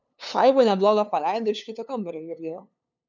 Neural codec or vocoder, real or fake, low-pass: codec, 16 kHz, 2 kbps, FunCodec, trained on LibriTTS, 25 frames a second; fake; 7.2 kHz